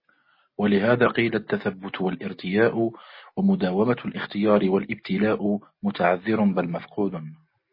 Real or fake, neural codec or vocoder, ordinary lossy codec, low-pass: real; none; MP3, 24 kbps; 5.4 kHz